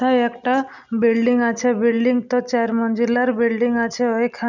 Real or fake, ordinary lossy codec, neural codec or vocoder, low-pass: real; none; none; 7.2 kHz